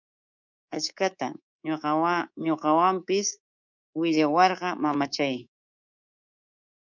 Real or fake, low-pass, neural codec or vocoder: fake; 7.2 kHz; codec, 24 kHz, 3.1 kbps, DualCodec